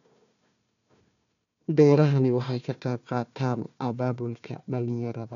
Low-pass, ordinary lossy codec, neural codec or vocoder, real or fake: 7.2 kHz; none; codec, 16 kHz, 1 kbps, FunCodec, trained on Chinese and English, 50 frames a second; fake